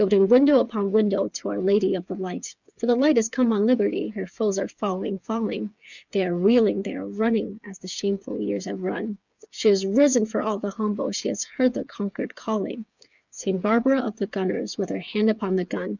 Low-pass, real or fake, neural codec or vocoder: 7.2 kHz; fake; codec, 16 kHz, 8 kbps, FreqCodec, smaller model